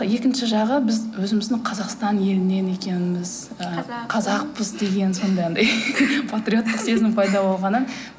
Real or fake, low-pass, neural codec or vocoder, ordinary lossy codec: real; none; none; none